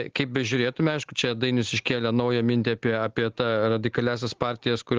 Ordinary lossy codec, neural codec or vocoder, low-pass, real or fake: Opus, 16 kbps; none; 7.2 kHz; real